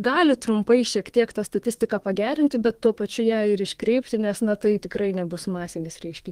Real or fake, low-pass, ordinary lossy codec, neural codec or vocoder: fake; 14.4 kHz; Opus, 24 kbps; codec, 32 kHz, 1.9 kbps, SNAC